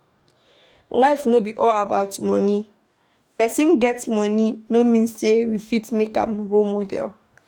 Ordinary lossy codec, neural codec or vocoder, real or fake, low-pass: none; codec, 44.1 kHz, 2.6 kbps, DAC; fake; 19.8 kHz